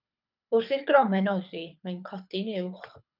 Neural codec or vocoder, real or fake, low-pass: codec, 24 kHz, 6 kbps, HILCodec; fake; 5.4 kHz